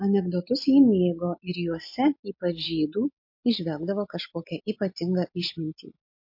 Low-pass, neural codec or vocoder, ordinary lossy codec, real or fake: 5.4 kHz; none; MP3, 32 kbps; real